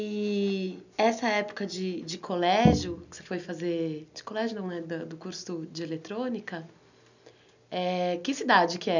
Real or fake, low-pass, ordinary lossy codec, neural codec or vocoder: real; 7.2 kHz; none; none